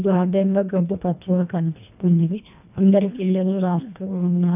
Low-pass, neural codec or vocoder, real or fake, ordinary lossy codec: 3.6 kHz; codec, 24 kHz, 1.5 kbps, HILCodec; fake; none